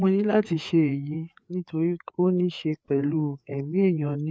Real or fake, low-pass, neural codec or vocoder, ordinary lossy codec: fake; none; codec, 16 kHz, 4 kbps, FreqCodec, larger model; none